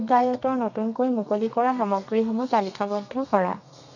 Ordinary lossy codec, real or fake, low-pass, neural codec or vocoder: none; fake; 7.2 kHz; codec, 32 kHz, 1.9 kbps, SNAC